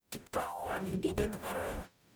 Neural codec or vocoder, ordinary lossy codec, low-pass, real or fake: codec, 44.1 kHz, 0.9 kbps, DAC; none; none; fake